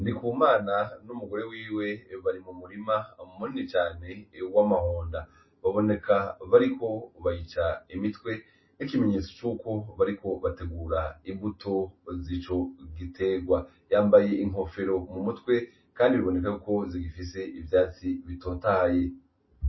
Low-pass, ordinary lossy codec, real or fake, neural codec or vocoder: 7.2 kHz; MP3, 24 kbps; real; none